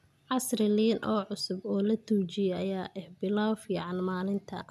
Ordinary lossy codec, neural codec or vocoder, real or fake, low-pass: none; none; real; 14.4 kHz